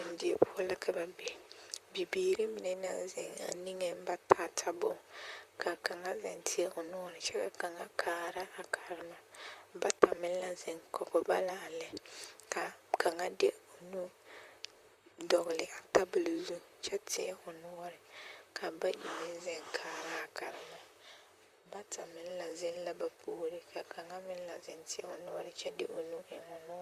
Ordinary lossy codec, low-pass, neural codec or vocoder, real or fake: Opus, 64 kbps; 14.4 kHz; vocoder, 44.1 kHz, 128 mel bands, Pupu-Vocoder; fake